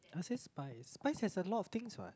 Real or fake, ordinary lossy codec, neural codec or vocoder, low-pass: real; none; none; none